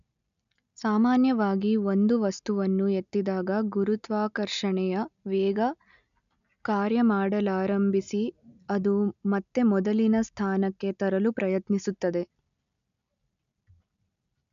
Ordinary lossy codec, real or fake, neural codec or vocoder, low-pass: none; real; none; 7.2 kHz